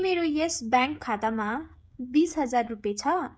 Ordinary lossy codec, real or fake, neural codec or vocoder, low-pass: none; fake; codec, 16 kHz, 16 kbps, FreqCodec, smaller model; none